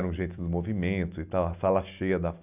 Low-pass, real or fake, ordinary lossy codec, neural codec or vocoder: 3.6 kHz; real; none; none